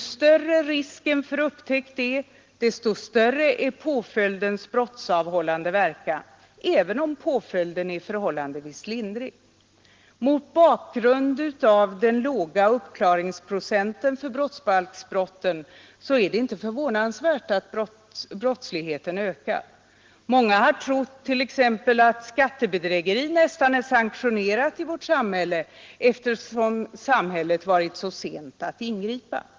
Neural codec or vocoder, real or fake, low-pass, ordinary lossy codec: none; real; 7.2 kHz; Opus, 16 kbps